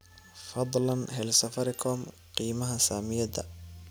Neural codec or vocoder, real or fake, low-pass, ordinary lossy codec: none; real; none; none